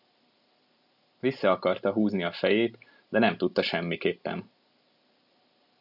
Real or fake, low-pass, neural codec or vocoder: real; 5.4 kHz; none